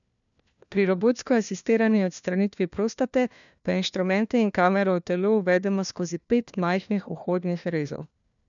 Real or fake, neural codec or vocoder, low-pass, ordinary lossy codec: fake; codec, 16 kHz, 1 kbps, FunCodec, trained on LibriTTS, 50 frames a second; 7.2 kHz; none